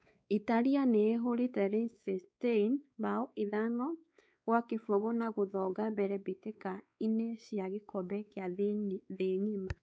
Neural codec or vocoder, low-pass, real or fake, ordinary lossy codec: codec, 16 kHz, 4 kbps, X-Codec, WavLM features, trained on Multilingual LibriSpeech; none; fake; none